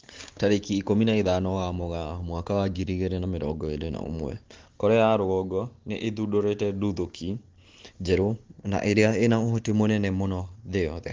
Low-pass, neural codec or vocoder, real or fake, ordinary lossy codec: 7.2 kHz; none; real; Opus, 16 kbps